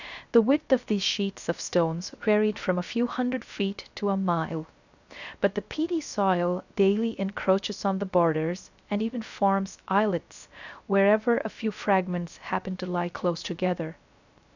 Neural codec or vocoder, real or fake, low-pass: codec, 16 kHz, 0.3 kbps, FocalCodec; fake; 7.2 kHz